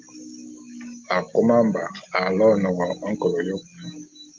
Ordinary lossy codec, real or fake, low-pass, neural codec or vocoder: Opus, 24 kbps; real; 7.2 kHz; none